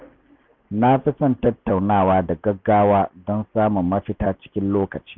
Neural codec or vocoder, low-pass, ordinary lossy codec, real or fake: none; none; none; real